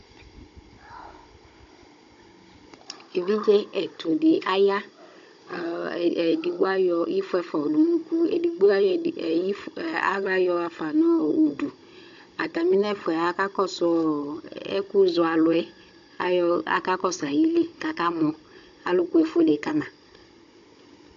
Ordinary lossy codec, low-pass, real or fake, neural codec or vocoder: AAC, 64 kbps; 7.2 kHz; fake; codec, 16 kHz, 16 kbps, FunCodec, trained on Chinese and English, 50 frames a second